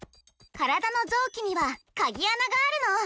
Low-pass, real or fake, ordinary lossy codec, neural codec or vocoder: none; real; none; none